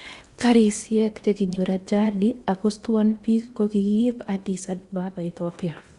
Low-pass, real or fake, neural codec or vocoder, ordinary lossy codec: 10.8 kHz; fake; codec, 16 kHz in and 24 kHz out, 0.8 kbps, FocalCodec, streaming, 65536 codes; Opus, 64 kbps